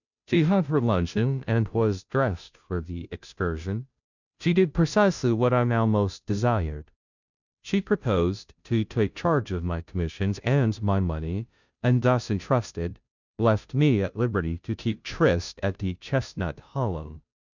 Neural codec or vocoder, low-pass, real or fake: codec, 16 kHz, 0.5 kbps, FunCodec, trained on Chinese and English, 25 frames a second; 7.2 kHz; fake